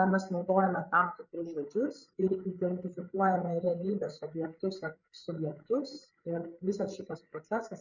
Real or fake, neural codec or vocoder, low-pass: fake; codec, 16 kHz, 8 kbps, FreqCodec, larger model; 7.2 kHz